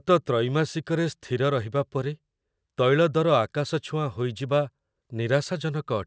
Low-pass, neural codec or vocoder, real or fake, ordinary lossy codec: none; none; real; none